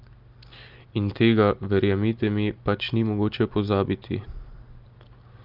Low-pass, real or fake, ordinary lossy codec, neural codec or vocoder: 5.4 kHz; real; Opus, 32 kbps; none